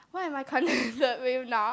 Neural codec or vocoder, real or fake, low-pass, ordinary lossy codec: none; real; none; none